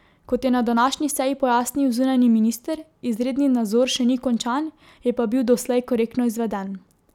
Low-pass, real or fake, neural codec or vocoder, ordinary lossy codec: 19.8 kHz; real; none; none